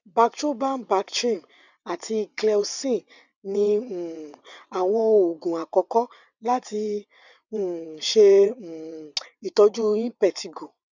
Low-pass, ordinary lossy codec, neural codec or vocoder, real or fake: 7.2 kHz; none; vocoder, 22.05 kHz, 80 mel bands, WaveNeXt; fake